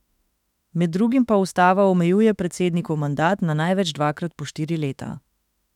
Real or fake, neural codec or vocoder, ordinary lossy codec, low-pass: fake; autoencoder, 48 kHz, 32 numbers a frame, DAC-VAE, trained on Japanese speech; none; 19.8 kHz